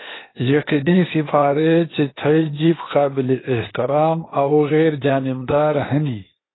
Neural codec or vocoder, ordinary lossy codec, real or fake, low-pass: codec, 16 kHz, 0.8 kbps, ZipCodec; AAC, 16 kbps; fake; 7.2 kHz